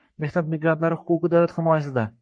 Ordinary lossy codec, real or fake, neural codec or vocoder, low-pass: MP3, 48 kbps; fake; codec, 44.1 kHz, 3.4 kbps, Pupu-Codec; 9.9 kHz